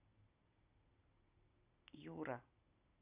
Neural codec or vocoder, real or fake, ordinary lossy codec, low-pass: none; real; Opus, 64 kbps; 3.6 kHz